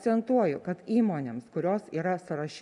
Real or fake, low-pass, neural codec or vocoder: real; 10.8 kHz; none